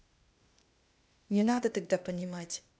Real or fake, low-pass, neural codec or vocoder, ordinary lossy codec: fake; none; codec, 16 kHz, 0.8 kbps, ZipCodec; none